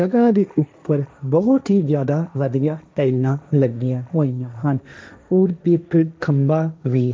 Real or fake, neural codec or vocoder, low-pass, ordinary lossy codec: fake; codec, 16 kHz, 1.1 kbps, Voila-Tokenizer; none; none